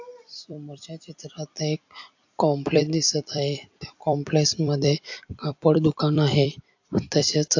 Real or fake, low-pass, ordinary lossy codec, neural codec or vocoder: fake; 7.2 kHz; AAC, 48 kbps; vocoder, 44.1 kHz, 128 mel bands every 256 samples, BigVGAN v2